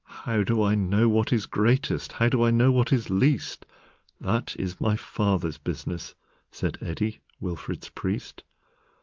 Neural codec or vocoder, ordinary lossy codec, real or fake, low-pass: none; Opus, 32 kbps; real; 7.2 kHz